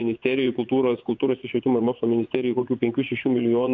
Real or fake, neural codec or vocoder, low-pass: fake; vocoder, 24 kHz, 100 mel bands, Vocos; 7.2 kHz